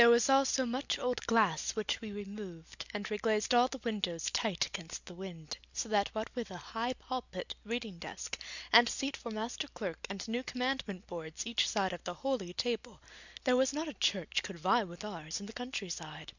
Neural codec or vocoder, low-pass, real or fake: none; 7.2 kHz; real